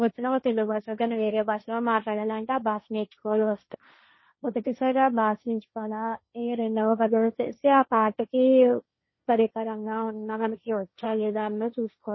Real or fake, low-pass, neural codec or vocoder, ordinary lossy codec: fake; 7.2 kHz; codec, 16 kHz, 1.1 kbps, Voila-Tokenizer; MP3, 24 kbps